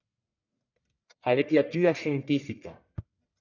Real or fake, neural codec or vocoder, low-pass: fake; codec, 44.1 kHz, 1.7 kbps, Pupu-Codec; 7.2 kHz